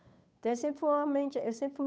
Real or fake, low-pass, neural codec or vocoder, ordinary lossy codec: fake; none; codec, 16 kHz, 8 kbps, FunCodec, trained on Chinese and English, 25 frames a second; none